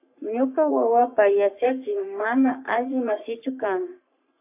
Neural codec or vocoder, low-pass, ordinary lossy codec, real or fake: codec, 44.1 kHz, 3.4 kbps, Pupu-Codec; 3.6 kHz; AAC, 32 kbps; fake